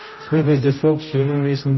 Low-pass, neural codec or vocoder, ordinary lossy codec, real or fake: 7.2 kHz; codec, 16 kHz, 0.5 kbps, X-Codec, HuBERT features, trained on general audio; MP3, 24 kbps; fake